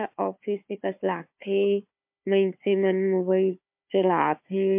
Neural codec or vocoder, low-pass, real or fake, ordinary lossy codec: codec, 16 kHz, 4 kbps, FunCodec, trained on Chinese and English, 50 frames a second; 3.6 kHz; fake; MP3, 32 kbps